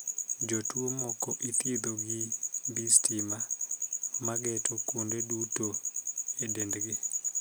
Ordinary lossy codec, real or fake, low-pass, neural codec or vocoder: none; real; none; none